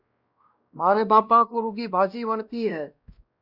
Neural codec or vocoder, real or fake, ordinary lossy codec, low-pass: codec, 16 kHz, 1 kbps, X-Codec, WavLM features, trained on Multilingual LibriSpeech; fake; Opus, 64 kbps; 5.4 kHz